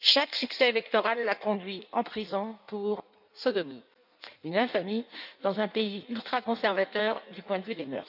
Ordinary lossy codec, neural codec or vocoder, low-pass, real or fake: none; codec, 16 kHz in and 24 kHz out, 1.1 kbps, FireRedTTS-2 codec; 5.4 kHz; fake